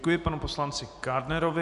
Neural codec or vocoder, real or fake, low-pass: none; real; 10.8 kHz